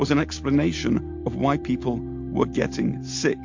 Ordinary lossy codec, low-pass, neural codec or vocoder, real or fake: MP3, 48 kbps; 7.2 kHz; none; real